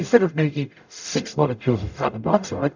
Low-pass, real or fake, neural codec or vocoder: 7.2 kHz; fake; codec, 44.1 kHz, 0.9 kbps, DAC